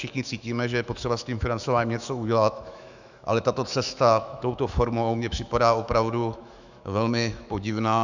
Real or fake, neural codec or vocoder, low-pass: fake; autoencoder, 48 kHz, 128 numbers a frame, DAC-VAE, trained on Japanese speech; 7.2 kHz